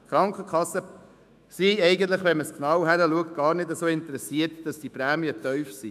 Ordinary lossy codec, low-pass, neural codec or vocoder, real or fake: none; 14.4 kHz; autoencoder, 48 kHz, 128 numbers a frame, DAC-VAE, trained on Japanese speech; fake